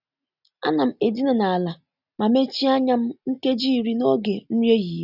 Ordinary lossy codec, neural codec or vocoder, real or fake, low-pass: none; none; real; 5.4 kHz